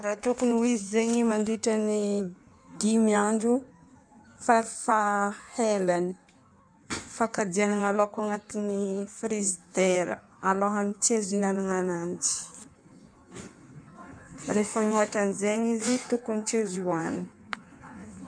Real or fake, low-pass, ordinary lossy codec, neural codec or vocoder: fake; 9.9 kHz; none; codec, 16 kHz in and 24 kHz out, 1.1 kbps, FireRedTTS-2 codec